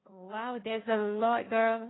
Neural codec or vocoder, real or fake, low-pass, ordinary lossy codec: codec, 16 kHz, 1.1 kbps, Voila-Tokenizer; fake; 7.2 kHz; AAC, 16 kbps